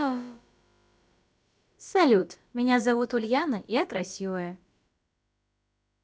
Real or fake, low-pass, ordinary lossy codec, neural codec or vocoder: fake; none; none; codec, 16 kHz, about 1 kbps, DyCAST, with the encoder's durations